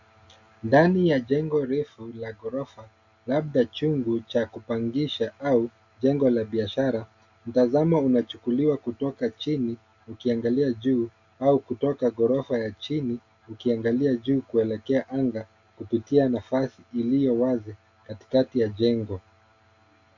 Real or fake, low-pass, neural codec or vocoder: real; 7.2 kHz; none